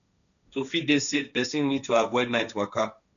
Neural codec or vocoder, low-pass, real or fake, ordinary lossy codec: codec, 16 kHz, 1.1 kbps, Voila-Tokenizer; 7.2 kHz; fake; none